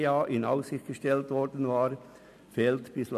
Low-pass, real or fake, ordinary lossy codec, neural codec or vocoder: 14.4 kHz; real; none; none